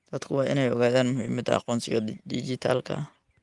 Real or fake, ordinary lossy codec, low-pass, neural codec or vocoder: real; Opus, 32 kbps; 10.8 kHz; none